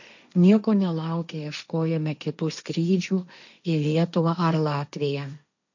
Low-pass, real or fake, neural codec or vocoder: 7.2 kHz; fake; codec, 16 kHz, 1.1 kbps, Voila-Tokenizer